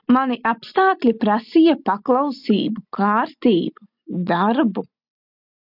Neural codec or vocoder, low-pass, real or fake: none; 5.4 kHz; real